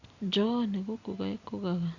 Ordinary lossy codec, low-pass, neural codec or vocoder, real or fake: Opus, 64 kbps; 7.2 kHz; none; real